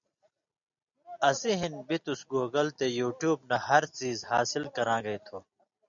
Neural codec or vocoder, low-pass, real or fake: none; 7.2 kHz; real